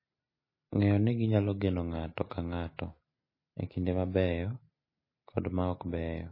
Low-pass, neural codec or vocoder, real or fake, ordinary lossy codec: 5.4 kHz; none; real; MP3, 24 kbps